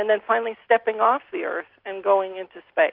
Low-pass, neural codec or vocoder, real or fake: 5.4 kHz; none; real